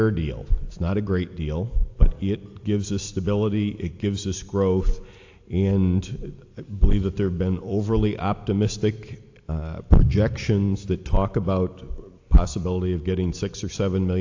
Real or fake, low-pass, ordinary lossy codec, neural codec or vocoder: real; 7.2 kHz; AAC, 48 kbps; none